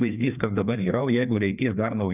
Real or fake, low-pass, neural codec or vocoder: fake; 3.6 kHz; codec, 16 kHz, 2 kbps, FreqCodec, larger model